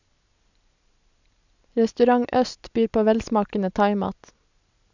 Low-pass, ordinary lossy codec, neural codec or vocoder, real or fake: 7.2 kHz; none; none; real